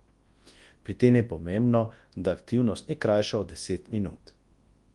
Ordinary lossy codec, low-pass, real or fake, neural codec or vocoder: Opus, 24 kbps; 10.8 kHz; fake; codec, 24 kHz, 0.9 kbps, WavTokenizer, large speech release